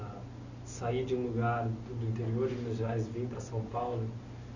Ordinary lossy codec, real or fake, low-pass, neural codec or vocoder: none; real; 7.2 kHz; none